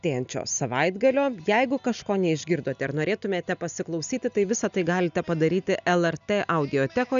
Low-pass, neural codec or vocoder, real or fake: 7.2 kHz; none; real